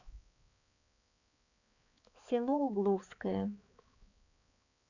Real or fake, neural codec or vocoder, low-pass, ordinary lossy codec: fake; codec, 16 kHz, 4 kbps, X-Codec, HuBERT features, trained on balanced general audio; 7.2 kHz; none